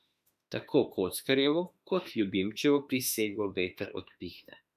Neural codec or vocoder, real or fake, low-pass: autoencoder, 48 kHz, 32 numbers a frame, DAC-VAE, trained on Japanese speech; fake; 14.4 kHz